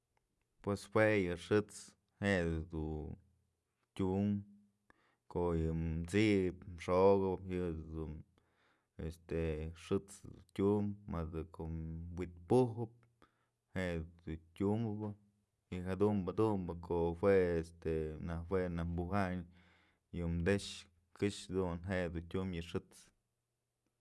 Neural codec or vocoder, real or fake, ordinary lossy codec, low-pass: none; real; none; none